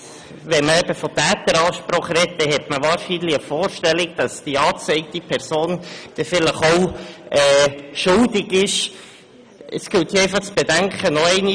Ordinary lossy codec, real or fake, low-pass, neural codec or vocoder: none; real; 9.9 kHz; none